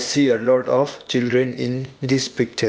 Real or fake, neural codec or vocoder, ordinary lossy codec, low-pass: fake; codec, 16 kHz, 0.8 kbps, ZipCodec; none; none